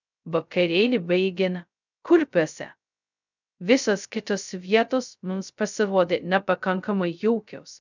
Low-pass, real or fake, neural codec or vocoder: 7.2 kHz; fake; codec, 16 kHz, 0.2 kbps, FocalCodec